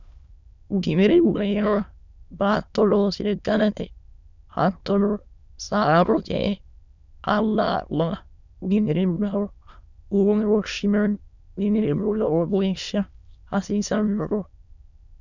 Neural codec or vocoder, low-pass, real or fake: autoencoder, 22.05 kHz, a latent of 192 numbers a frame, VITS, trained on many speakers; 7.2 kHz; fake